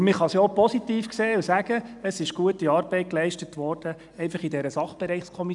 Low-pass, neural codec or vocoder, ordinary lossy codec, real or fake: 10.8 kHz; none; none; real